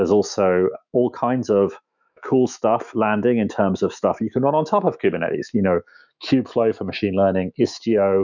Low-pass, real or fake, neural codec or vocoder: 7.2 kHz; fake; autoencoder, 48 kHz, 128 numbers a frame, DAC-VAE, trained on Japanese speech